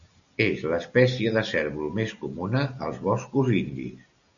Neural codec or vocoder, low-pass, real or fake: none; 7.2 kHz; real